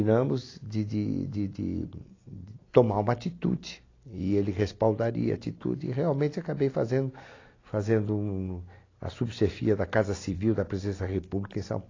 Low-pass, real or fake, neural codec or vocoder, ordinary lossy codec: 7.2 kHz; real; none; AAC, 32 kbps